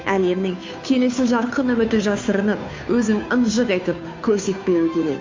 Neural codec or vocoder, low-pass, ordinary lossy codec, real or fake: codec, 16 kHz, 2 kbps, FunCodec, trained on Chinese and English, 25 frames a second; 7.2 kHz; MP3, 48 kbps; fake